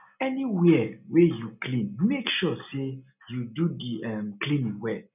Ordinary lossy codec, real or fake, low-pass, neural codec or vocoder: none; real; 3.6 kHz; none